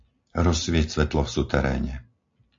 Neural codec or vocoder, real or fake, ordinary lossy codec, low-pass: none; real; AAC, 48 kbps; 7.2 kHz